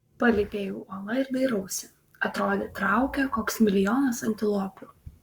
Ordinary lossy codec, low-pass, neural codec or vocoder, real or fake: Opus, 64 kbps; 19.8 kHz; codec, 44.1 kHz, 7.8 kbps, Pupu-Codec; fake